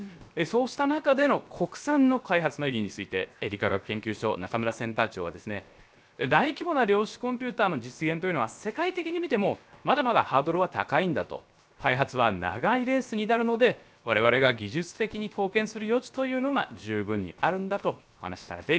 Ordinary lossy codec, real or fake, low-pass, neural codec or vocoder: none; fake; none; codec, 16 kHz, 0.7 kbps, FocalCodec